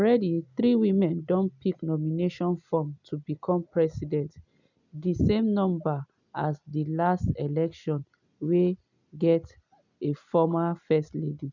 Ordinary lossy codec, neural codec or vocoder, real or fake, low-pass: none; none; real; 7.2 kHz